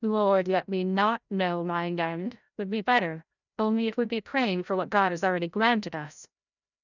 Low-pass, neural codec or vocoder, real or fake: 7.2 kHz; codec, 16 kHz, 0.5 kbps, FreqCodec, larger model; fake